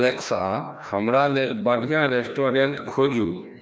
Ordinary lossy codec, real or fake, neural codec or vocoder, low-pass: none; fake; codec, 16 kHz, 1 kbps, FreqCodec, larger model; none